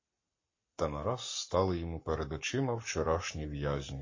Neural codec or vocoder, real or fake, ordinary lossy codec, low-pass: codec, 44.1 kHz, 7.8 kbps, Pupu-Codec; fake; MP3, 32 kbps; 7.2 kHz